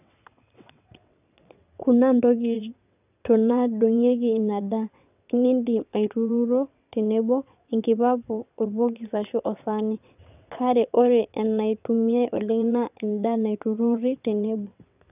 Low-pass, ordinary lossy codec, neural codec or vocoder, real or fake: 3.6 kHz; none; vocoder, 22.05 kHz, 80 mel bands, WaveNeXt; fake